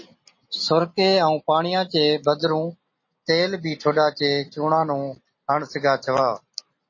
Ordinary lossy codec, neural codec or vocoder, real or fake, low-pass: MP3, 32 kbps; none; real; 7.2 kHz